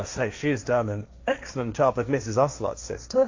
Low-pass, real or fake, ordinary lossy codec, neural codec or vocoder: 7.2 kHz; fake; AAC, 48 kbps; codec, 16 kHz, 1.1 kbps, Voila-Tokenizer